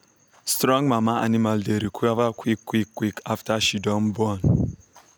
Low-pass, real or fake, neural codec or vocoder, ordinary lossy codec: none; real; none; none